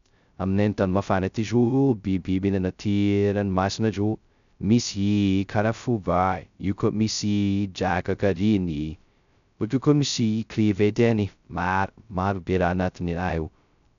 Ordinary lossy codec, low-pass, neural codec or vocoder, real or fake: none; 7.2 kHz; codec, 16 kHz, 0.2 kbps, FocalCodec; fake